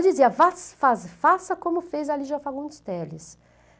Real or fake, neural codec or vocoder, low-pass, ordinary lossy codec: real; none; none; none